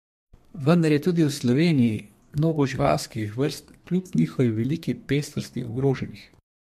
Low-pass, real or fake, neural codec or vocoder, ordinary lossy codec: 14.4 kHz; fake; codec, 32 kHz, 1.9 kbps, SNAC; MP3, 64 kbps